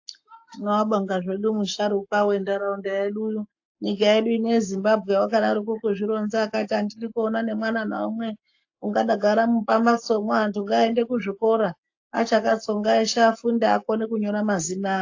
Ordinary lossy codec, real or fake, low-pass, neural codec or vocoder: AAC, 48 kbps; fake; 7.2 kHz; codec, 44.1 kHz, 7.8 kbps, Pupu-Codec